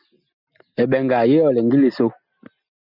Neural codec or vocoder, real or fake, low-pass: none; real; 5.4 kHz